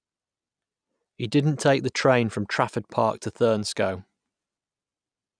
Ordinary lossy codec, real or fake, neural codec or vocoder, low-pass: none; real; none; 9.9 kHz